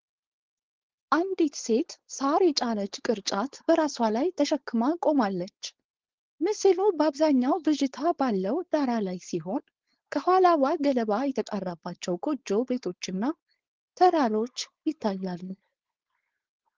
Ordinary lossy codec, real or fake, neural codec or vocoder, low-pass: Opus, 16 kbps; fake; codec, 16 kHz, 4.8 kbps, FACodec; 7.2 kHz